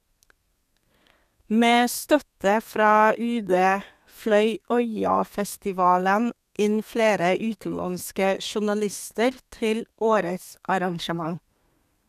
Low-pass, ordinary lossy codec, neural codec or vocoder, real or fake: 14.4 kHz; none; codec, 32 kHz, 1.9 kbps, SNAC; fake